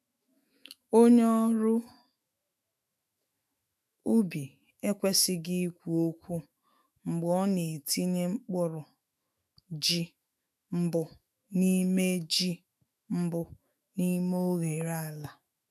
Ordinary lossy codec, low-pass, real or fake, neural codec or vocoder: none; 14.4 kHz; fake; autoencoder, 48 kHz, 128 numbers a frame, DAC-VAE, trained on Japanese speech